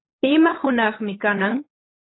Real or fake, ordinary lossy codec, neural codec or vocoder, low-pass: fake; AAC, 16 kbps; codec, 16 kHz, 8 kbps, FunCodec, trained on LibriTTS, 25 frames a second; 7.2 kHz